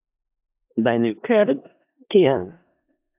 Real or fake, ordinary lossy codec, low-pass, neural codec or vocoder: fake; AAC, 32 kbps; 3.6 kHz; codec, 16 kHz in and 24 kHz out, 0.4 kbps, LongCat-Audio-Codec, four codebook decoder